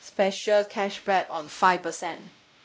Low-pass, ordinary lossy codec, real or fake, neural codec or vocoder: none; none; fake; codec, 16 kHz, 0.5 kbps, X-Codec, WavLM features, trained on Multilingual LibriSpeech